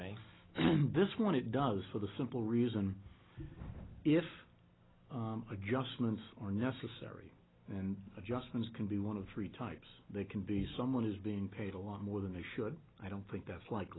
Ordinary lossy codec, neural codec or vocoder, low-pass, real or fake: AAC, 16 kbps; none; 7.2 kHz; real